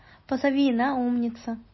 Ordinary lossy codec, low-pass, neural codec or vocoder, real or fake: MP3, 24 kbps; 7.2 kHz; none; real